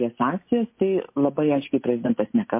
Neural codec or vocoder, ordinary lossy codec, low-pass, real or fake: none; MP3, 24 kbps; 3.6 kHz; real